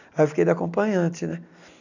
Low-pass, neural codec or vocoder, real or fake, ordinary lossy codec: 7.2 kHz; none; real; none